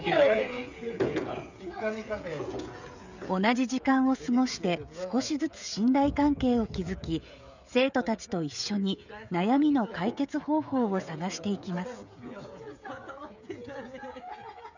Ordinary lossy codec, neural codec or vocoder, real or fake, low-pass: none; codec, 16 kHz, 16 kbps, FreqCodec, smaller model; fake; 7.2 kHz